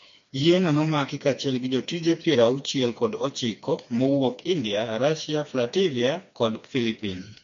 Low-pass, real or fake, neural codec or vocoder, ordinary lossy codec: 7.2 kHz; fake; codec, 16 kHz, 2 kbps, FreqCodec, smaller model; MP3, 48 kbps